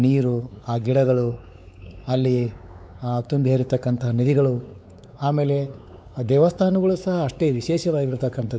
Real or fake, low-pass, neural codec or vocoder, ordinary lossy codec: fake; none; codec, 16 kHz, 4 kbps, X-Codec, WavLM features, trained on Multilingual LibriSpeech; none